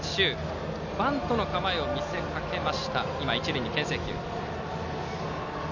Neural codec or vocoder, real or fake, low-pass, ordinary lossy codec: none; real; 7.2 kHz; none